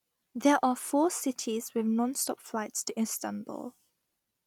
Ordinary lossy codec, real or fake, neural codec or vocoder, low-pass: none; real; none; 19.8 kHz